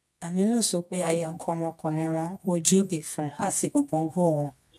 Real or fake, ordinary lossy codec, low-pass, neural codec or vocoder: fake; none; none; codec, 24 kHz, 0.9 kbps, WavTokenizer, medium music audio release